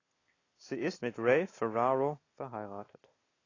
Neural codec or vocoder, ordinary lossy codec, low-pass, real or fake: none; AAC, 32 kbps; 7.2 kHz; real